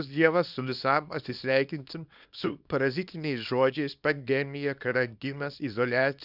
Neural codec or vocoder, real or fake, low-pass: codec, 24 kHz, 0.9 kbps, WavTokenizer, small release; fake; 5.4 kHz